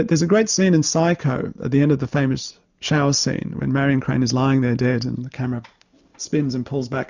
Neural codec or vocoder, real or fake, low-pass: none; real; 7.2 kHz